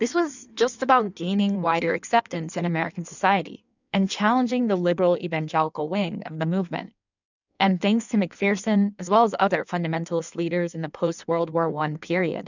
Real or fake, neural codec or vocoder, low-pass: fake; codec, 16 kHz in and 24 kHz out, 1.1 kbps, FireRedTTS-2 codec; 7.2 kHz